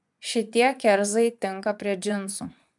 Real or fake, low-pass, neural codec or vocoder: fake; 10.8 kHz; vocoder, 24 kHz, 100 mel bands, Vocos